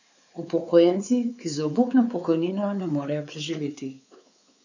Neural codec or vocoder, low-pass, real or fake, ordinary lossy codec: codec, 16 kHz, 4 kbps, X-Codec, WavLM features, trained on Multilingual LibriSpeech; 7.2 kHz; fake; none